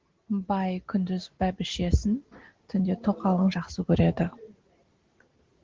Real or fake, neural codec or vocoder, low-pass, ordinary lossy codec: real; none; 7.2 kHz; Opus, 16 kbps